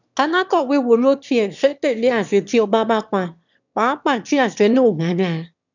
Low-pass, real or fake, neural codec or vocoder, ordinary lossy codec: 7.2 kHz; fake; autoencoder, 22.05 kHz, a latent of 192 numbers a frame, VITS, trained on one speaker; none